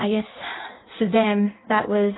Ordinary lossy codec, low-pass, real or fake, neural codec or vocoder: AAC, 16 kbps; 7.2 kHz; fake; codec, 16 kHz in and 24 kHz out, 1.1 kbps, FireRedTTS-2 codec